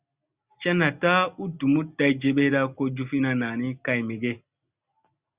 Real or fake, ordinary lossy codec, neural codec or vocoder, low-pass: real; Opus, 64 kbps; none; 3.6 kHz